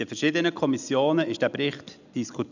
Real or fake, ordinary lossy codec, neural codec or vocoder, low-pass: real; none; none; 7.2 kHz